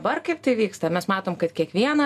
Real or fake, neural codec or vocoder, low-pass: real; none; 14.4 kHz